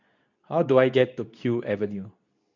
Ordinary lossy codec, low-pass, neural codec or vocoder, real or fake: none; 7.2 kHz; codec, 24 kHz, 0.9 kbps, WavTokenizer, medium speech release version 2; fake